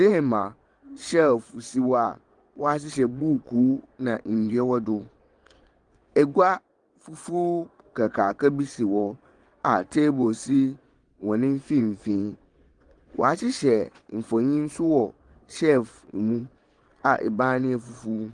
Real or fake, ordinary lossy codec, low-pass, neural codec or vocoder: fake; Opus, 24 kbps; 9.9 kHz; vocoder, 22.05 kHz, 80 mel bands, WaveNeXt